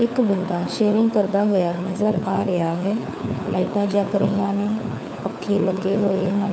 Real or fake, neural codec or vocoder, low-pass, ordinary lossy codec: fake; codec, 16 kHz, 4 kbps, FunCodec, trained on LibriTTS, 50 frames a second; none; none